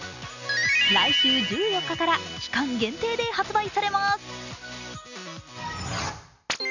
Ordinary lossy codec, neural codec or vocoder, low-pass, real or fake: none; none; 7.2 kHz; real